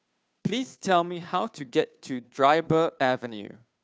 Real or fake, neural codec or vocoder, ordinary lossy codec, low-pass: fake; codec, 16 kHz, 2 kbps, FunCodec, trained on Chinese and English, 25 frames a second; none; none